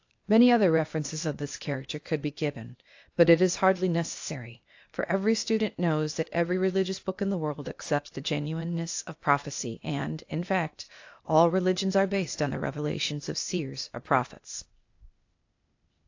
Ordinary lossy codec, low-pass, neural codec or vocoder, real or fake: AAC, 48 kbps; 7.2 kHz; codec, 16 kHz, 0.8 kbps, ZipCodec; fake